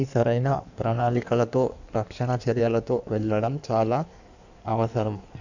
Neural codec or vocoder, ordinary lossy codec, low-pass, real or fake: codec, 16 kHz in and 24 kHz out, 1.1 kbps, FireRedTTS-2 codec; none; 7.2 kHz; fake